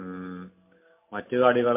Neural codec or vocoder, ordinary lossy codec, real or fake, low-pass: none; MP3, 24 kbps; real; 3.6 kHz